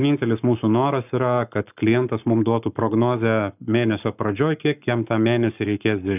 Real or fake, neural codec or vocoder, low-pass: fake; codec, 44.1 kHz, 7.8 kbps, Pupu-Codec; 3.6 kHz